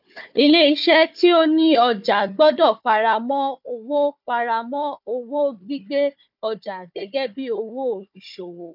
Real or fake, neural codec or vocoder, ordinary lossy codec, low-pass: fake; codec, 16 kHz, 4 kbps, FunCodec, trained on Chinese and English, 50 frames a second; none; 5.4 kHz